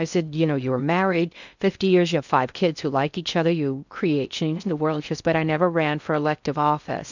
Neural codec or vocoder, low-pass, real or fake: codec, 16 kHz in and 24 kHz out, 0.6 kbps, FocalCodec, streaming, 2048 codes; 7.2 kHz; fake